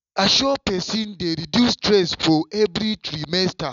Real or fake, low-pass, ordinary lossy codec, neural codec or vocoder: real; 7.2 kHz; none; none